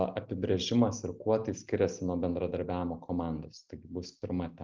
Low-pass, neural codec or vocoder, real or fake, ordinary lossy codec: 7.2 kHz; none; real; Opus, 24 kbps